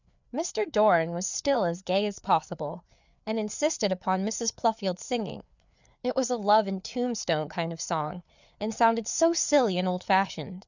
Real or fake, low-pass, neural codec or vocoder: fake; 7.2 kHz; codec, 16 kHz, 4 kbps, FreqCodec, larger model